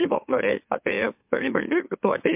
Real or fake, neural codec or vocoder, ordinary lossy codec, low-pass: fake; autoencoder, 44.1 kHz, a latent of 192 numbers a frame, MeloTTS; MP3, 32 kbps; 3.6 kHz